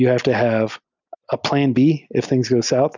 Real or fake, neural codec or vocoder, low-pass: real; none; 7.2 kHz